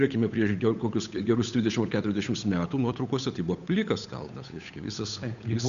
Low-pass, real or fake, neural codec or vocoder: 7.2 kHz; fake; codec, 16 kHz, 8 kbps, FunCodec, trained on Chinese and English, 25 frames a second